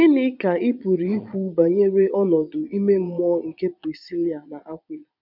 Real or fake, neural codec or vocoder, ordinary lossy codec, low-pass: fake; vocoder, 22.05 kHz, 80 mel bands, Vocos; none; 5.4 kHz